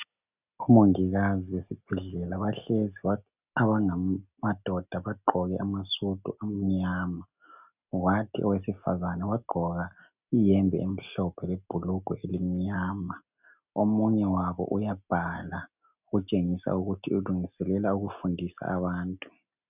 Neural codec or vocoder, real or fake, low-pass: none; real; 3.6 kHz